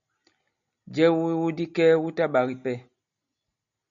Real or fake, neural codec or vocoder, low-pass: real; none; 7.2 kHz